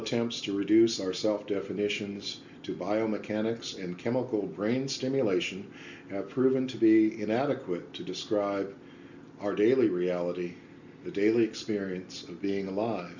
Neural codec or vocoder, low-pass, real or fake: none; 7.2 kHz; real